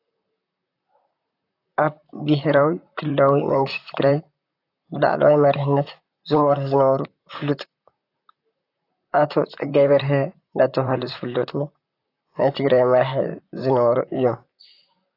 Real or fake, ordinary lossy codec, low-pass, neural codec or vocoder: fake; AAC, 32 kbps; 5.4 kHz; vocoder, 44.1 kHz, 128 mel bands, Pupu-Vocoder